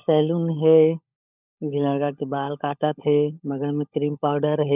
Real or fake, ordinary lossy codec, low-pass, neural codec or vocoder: fake; none; 3.6 kHz; codec, 16 kHz, 16 kbps, FunCodec, trained on LibriTTS, 50 frames a second